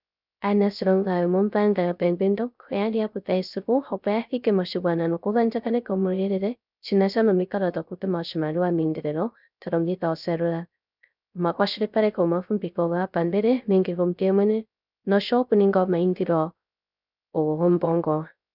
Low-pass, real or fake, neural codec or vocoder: 5.4 kHz; fake; codec, 16 kHz, 0.3 kbps, FocalCodec